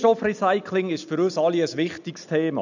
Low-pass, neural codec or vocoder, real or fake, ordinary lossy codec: 7.2 kHz; none; real; none